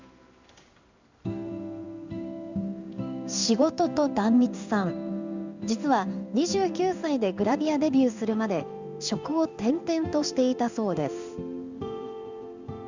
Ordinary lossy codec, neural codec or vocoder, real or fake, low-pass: Opus, 64 kbps; codec, 16 kHz in and 24 kHz out, 1 kbps, XY-Tokenizer; fake; 7.2 kHz